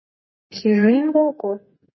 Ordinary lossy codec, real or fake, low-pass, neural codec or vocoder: MP3, 24 kbps; fake; 7.2 kHz; codec, 44.1 kHz, 2.6 kbps, SNAC